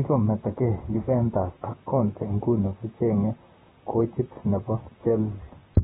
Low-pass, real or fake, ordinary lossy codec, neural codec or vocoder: 19.8 kHz; fake; AAC, 16 kbps; vocoder, 44.1 kHz, 128 mel bands, Pupu-Vocoder